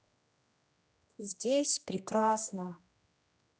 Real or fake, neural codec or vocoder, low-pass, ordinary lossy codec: fake; codec, 16 kHz, 1 kbps, X-Codec, HuBERT features, trained on general audio; none; none